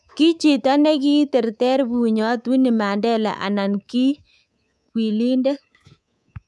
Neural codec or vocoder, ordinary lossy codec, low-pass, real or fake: codec, 24 kHz, 3.1 kbps, DualCodec; none; none; fake